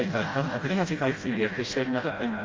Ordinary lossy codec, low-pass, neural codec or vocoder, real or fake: Opus, 32 kbps; 7.2 kHz; codec, 16 kHz, 0.5 kbps, FreqCodec, smaller model; fake